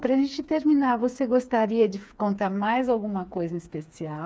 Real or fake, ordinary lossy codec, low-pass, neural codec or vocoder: fake; none; none; codec, 16 kHz, 4 kbps, FreqCodec, smaller model